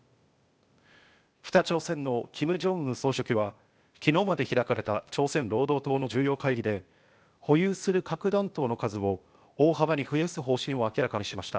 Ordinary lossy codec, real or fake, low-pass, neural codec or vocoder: none; fake; none; codec, 16 kHz, 0.8 kbps, ZipCodec